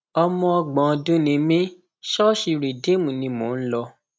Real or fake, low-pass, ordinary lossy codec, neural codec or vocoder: real; none; none; none